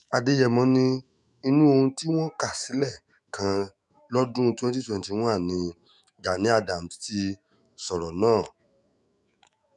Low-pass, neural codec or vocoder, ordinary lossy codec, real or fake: 10.8 kHz; autoencoder, 48 kHz, 128 numbers a frame, DAC-VAE, trained on Japanese speech; none; fake